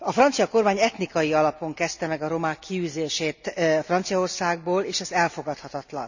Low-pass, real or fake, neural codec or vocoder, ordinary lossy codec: 7.2 kHz; real; none; none